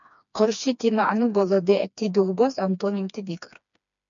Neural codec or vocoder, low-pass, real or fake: codec, 16 kHz, 2 kbps, FreqCodec, smaller model; 7.2 kHz; fake